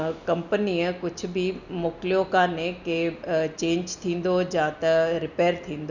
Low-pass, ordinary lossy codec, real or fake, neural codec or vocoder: 7.2 kHz; none; real; none